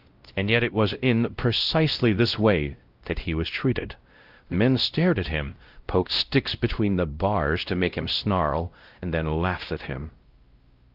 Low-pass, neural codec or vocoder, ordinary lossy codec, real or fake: 5.4 kHz; codec, 16 kHz, 0.5 kbps, X-Codec, WavLM features, trained on Multilingual LibriSpeech; Opus, 32 kbps; fake